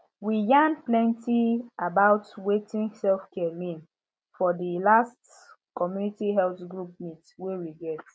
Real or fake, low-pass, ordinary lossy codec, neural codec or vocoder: real; none; none; none